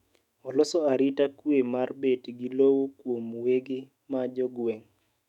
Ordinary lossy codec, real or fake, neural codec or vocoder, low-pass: none; fake; autoencoder, 48 kHz, 128 numbers a frame, DAC-VAE, trained on Japanese speech; 19.8 kHz